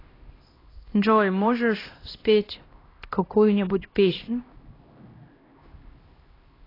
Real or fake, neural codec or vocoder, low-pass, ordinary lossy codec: fake; codec, 16 kHz, 1 kbps, X-Codec, HuBERT features, trained on LibriSpeech; 5.4 kHz; AAC, 24 kbps